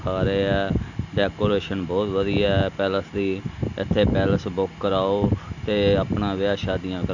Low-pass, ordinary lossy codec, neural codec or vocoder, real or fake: 7.2 kHz; none; none; real